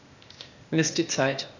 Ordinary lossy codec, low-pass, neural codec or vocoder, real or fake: none; 7.2 kHz; codec, 16 kHz, 0.8 kbps, ZipCodec; fake